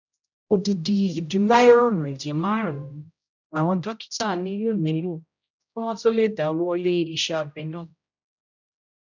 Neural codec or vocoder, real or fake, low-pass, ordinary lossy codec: codec, 16 kHz, 0.5 kbps, X-Codec, HuBERT features, trained on general audio; fake; 7.2 kHz; none